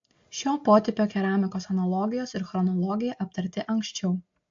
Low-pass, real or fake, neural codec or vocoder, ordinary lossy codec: 7.2 kHz; real; none; AAC, 48 kbps